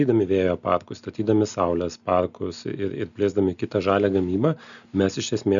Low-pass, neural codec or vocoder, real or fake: 7.2 kHz; none; real